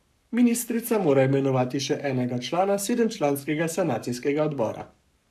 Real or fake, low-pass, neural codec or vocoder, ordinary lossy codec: fake; 14.4 kHz; codec, 44.1 kHz, 7.8 kbps, Pupu-Codec; none